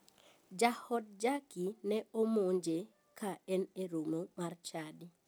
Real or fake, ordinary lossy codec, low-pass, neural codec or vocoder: real; none; none; none